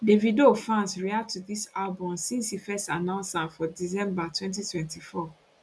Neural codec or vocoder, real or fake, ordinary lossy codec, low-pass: none; real; none; none